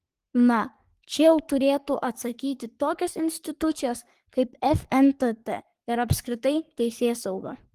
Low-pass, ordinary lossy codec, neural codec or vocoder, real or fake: 14.4 kHz; Opus, 24 kbps; codec, 44.1 kHz, 3.4 kbps, Pupu-Codec; fake